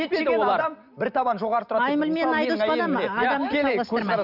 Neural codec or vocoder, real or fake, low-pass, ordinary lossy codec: none; real; 5.4 kHz; none